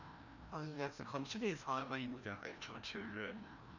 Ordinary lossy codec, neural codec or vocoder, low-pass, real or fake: none; codec, 16 kHz, 1 kbps, FreqCodec, larger model; 7.2 kHz; fake